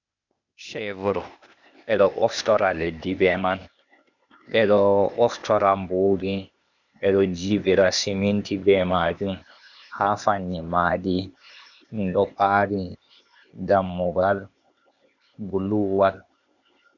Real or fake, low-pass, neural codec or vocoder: fake; 7.2 kHz; codec, 16 kHz, 0.8 kbps, ZipCodec